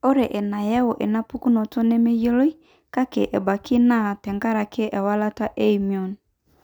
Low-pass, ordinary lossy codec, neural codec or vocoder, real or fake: 19.8 kHz; none; none; real